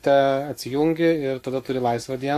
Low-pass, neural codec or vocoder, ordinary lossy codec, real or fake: 14.4 kHz; codec, 44.1 kHz, 7.8 kbps, Pupu-Codec; AAC, 64 kbps; fake